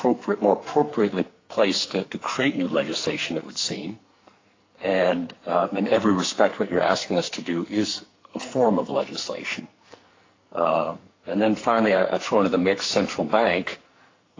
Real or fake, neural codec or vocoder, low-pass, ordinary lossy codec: fake; codec, 44.1 kHz, 2.6 kbps, SNAC; 7.2 kHz; AAC, 32 kbps